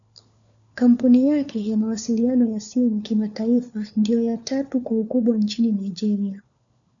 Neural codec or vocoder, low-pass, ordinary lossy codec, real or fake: codec, 16 kHz, 4 kbps, FunCodec, trained on LibriTTS, 50 frames a second; 7.2 kHz; MP3, 96 kbps; fake